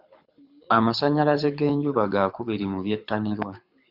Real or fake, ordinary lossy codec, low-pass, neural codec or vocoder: fake; Opus, 64 kbps; 5.4 kHz; codec, 24 kHz, 6 kbps, HILCodec